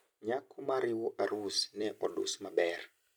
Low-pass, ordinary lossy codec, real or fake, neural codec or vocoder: none; none; fake; vocoder, 44.1 kHz, 128 mel bands every 256 samples, BigVGAN v2